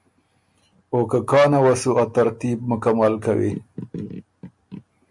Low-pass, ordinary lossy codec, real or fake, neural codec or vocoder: 10.8 kHz; MP3, 64 kbps; real; none